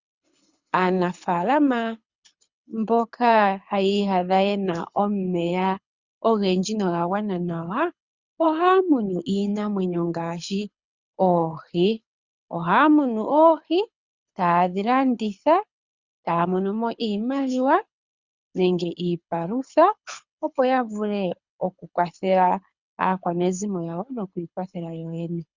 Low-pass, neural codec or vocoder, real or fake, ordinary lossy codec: 7.2 kHz; codec, 24 kHz, 6 kbps, HILCodec; fake; Opus, 64 kbps